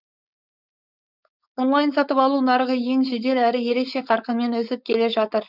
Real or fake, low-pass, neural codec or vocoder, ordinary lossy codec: fake; 5.4 kHz; codec, 16 kHz, 4.8 kbps, FACodec; none